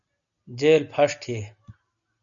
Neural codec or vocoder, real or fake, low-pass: none; real; 7.2 kHz